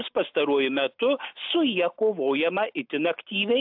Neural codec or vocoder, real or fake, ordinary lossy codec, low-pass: none; real; AAC, 48 kbps; 5.4 kHz